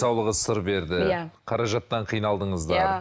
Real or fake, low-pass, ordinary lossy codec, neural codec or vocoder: real; none; none; none